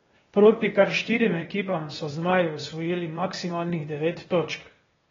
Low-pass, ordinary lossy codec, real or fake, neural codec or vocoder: 7.2 kHz; AAC, 24 kbps; fake; codec, 16 kHz, 0.8 kbps, ZipCodec